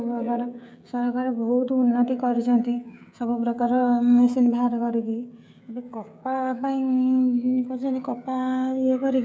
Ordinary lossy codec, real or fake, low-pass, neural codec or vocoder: none; fake; none; codec, 16 kHz, 16 kbps, FreqCodec, smaller model